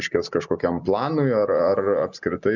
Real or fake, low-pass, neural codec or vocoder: real; 7.2 kHz; none